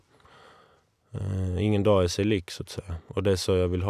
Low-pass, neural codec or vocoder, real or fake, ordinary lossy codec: 14.4 kHz; none; real; none